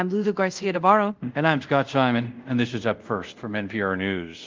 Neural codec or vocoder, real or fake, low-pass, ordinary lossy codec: codec, 24 kHz, 0.5 kbps, DualCodec; fake; 7.2 kHz; Opus, 24 kbps